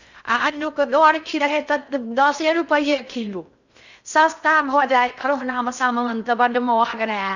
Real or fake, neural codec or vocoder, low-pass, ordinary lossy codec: fake; codec, 16 kHz in and 24 kHz out, 0.6 kbps, FocalCodec, streaming, 2048 codes; 7.2 kHz; none